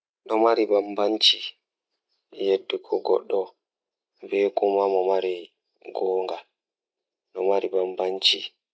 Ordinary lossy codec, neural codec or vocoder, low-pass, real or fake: none; none; none; real